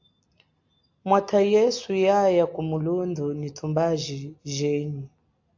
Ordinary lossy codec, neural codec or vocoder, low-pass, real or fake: AAC, 48 kbps; none; 7.2 kHz; real